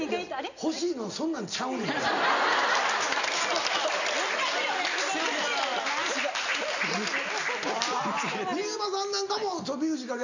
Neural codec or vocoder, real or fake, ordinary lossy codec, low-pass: none; real; none; 7.2 kHz